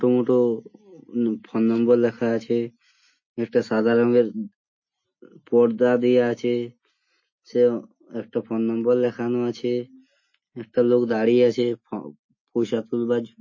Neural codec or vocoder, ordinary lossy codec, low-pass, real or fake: none; MP3, 32 kbps; 7.2 kHz; real